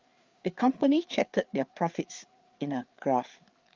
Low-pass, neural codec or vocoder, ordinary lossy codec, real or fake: 7.2 kHz; codec, 16 kHz, 4 kbps, FreqCodec, larger model; Opus, 32 kbps; fake